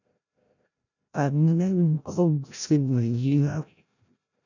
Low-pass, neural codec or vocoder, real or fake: 7.2 kHz; codec, 16 kHz, 0.5 kbps, FreqCodec, larger model; fake